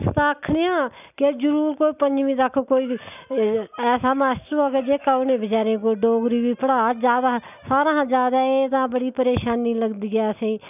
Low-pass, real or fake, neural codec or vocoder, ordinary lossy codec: 3.6 kHz; real; none; none